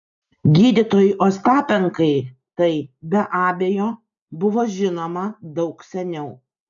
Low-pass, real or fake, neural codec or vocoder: 7.2 kHz; real; none